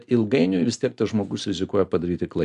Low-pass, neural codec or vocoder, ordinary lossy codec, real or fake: 10.8 kHz; none; MP3, 96 kbps; real